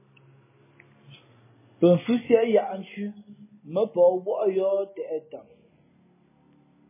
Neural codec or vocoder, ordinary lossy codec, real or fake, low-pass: none; MP3, 16 kbps; real; 3.6 kHz